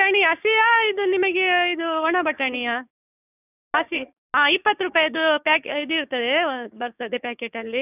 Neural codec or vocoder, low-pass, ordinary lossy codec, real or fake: codec, 16 kHz, 6 kbps, DAC; 3.6 kHz; none; fake